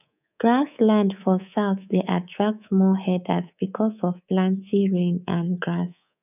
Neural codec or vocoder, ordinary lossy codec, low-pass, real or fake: codec, 24 kHz, 3.1 kbps, DualCodec; none; 3.6 kHz; fake